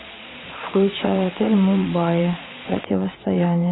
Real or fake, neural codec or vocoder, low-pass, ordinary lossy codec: fake; vocoder, 22.05 kHz, 80 mel bands, Vocos; 7.2 kHz; AAC, 16 kbps